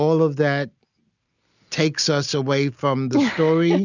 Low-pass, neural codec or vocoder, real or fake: 7.2 kHz; none; real